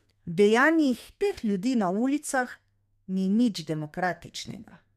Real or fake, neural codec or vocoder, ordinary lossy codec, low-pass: fake; codec, 32 kHz, 1.9 kbps, SNAC; MP3, 96 kbps; 14.4 kHz